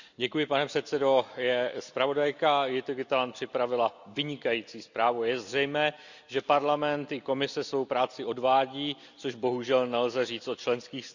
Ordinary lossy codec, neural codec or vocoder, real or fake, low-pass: none; none; real; 7.2 kHz